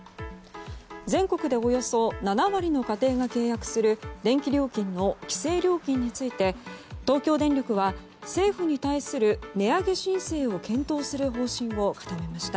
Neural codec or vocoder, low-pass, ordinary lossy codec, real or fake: none; none; none; real